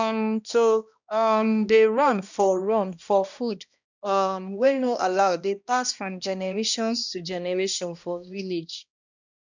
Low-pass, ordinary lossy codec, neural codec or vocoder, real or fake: 7.2 kHz; none; codec, 16 kHz, 1 kbps, X-Codec, HuBERT features, trained on balanced general audio; fake